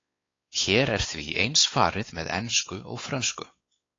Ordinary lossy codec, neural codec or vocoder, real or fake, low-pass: AAC, 32 kbps; codec, 16 kHz, 4 kbps, X-Codec, WavLM features, trained on Multilingual LibriSpeech; fake; 7.2 kHz